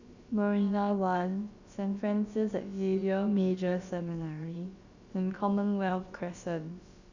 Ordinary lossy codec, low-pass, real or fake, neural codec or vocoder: Opus, 64 kbps; 7.2 kHz; fake; codec, 16 kHz, about 1 kbps, DyCAST, with the encoder's durations